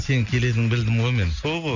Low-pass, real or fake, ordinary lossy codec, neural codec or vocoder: 7.2 kHz; real; none; none